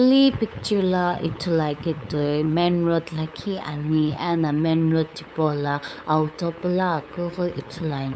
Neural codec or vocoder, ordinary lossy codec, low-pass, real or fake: codec, 16 kHz, 8 kbps, FunCodec, trained on LibriTTS, 25 frames a second; none; none; fake